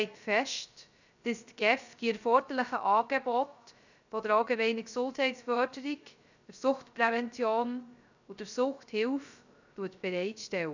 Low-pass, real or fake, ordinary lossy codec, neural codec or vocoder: 7.2 kHz; fake; none; codec, 16 kHz, 0.3 kbps, FocalCodec